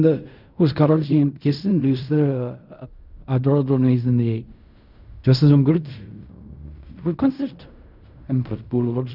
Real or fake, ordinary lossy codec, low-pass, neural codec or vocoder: fake; none; 5.4 kHz; codec, 16 kHz in and 24 kHz out, 0.4 kbps, LongCat-Audio-Codec, fine tuned four codebook decoder